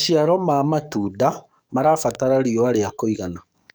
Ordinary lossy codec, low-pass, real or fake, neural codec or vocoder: none; none; fake; codec, 44.1 kHz, 7.8 kbps, DAC